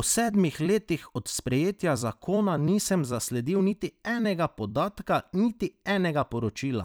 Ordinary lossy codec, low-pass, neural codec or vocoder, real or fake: none; none; vocoder, 44.1 kHz, 128 mel bands every 256 samples, BigVGAN v2; fake